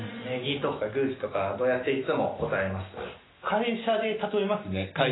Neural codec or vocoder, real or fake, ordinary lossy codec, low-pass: none; real; AAC, 16 kbps; 7.2 kHz